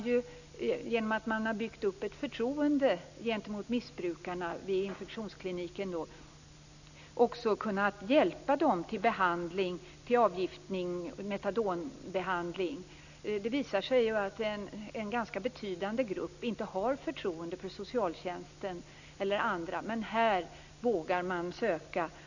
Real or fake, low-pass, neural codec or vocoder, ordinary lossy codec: real; 7.2 kHz; none; none